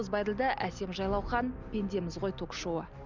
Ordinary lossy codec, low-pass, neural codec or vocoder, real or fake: none; 7.2 kHz; none; real